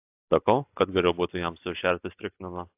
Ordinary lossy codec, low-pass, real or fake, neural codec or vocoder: AAC, 32 kbps; 3.6 kHz; real; none